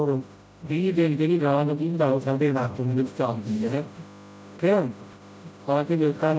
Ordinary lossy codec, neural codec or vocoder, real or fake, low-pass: none; codec, 16 kHz, 0.5 kbps, FreqCodec, smaller model; fake; none